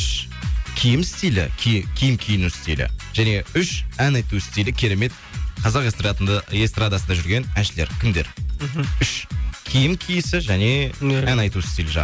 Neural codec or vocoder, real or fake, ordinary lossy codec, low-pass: none; real; none; none